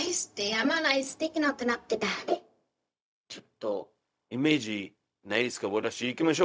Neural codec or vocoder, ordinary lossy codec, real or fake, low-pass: codec, 16 kHz, 0.4 kbps, LongCat-Audio-Codec; none; fake; none